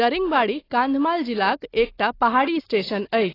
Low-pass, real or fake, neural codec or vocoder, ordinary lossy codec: 5.4 kHz; real; none; AAC, 24 kbps